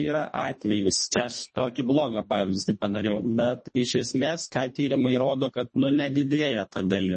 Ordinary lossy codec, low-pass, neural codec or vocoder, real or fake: MP3, 32 kbps; 10.8 kHz; codec, 24 kHz, 1.5 kbps, HILCodec; fake